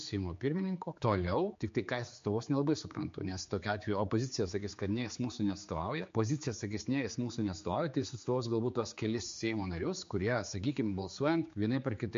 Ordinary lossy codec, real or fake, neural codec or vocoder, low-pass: MP3, 48 kbps; fake; codec, 16 kHz, 4 kbps, X-Codec, HuBERT features, trained on general audio; 7.2 kHz